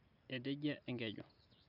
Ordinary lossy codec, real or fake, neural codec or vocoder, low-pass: none; real; none; 5.4 kHz